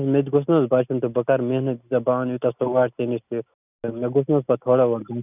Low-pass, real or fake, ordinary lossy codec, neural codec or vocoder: 3.6 kHz; real; none; none